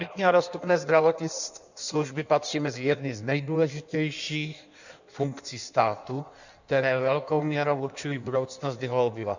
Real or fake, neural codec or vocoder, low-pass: fake; codec, 16 kHz in and 24 kHz out, 1.1 kbps, FireRedTTS-2 codec; 7.2 kHz